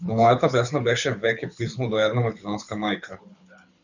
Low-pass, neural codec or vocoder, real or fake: 7.2 kHz; codec, 24 kHz, 6 kbps, HILCodec; fake